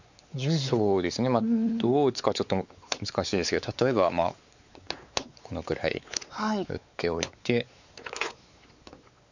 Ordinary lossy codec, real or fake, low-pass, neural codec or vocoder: none; fake; 7.2 kHz; codec, 16 kHz, 4 kbps, X-Codec, WavLM features, trained on Multilingual LibriSpeech